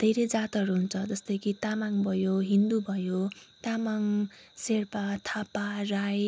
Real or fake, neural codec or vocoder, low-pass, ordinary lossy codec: real; none; none; none